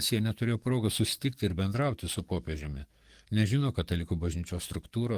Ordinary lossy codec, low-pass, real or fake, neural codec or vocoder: Opus, 24 kbps; 14.4 kHz; fake; codec, 44.1 kHz, 7.8 kbps, DAC